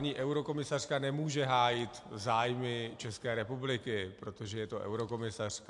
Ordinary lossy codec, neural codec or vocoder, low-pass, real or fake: AAC, 64 kbps; none; 10.8 kHz; real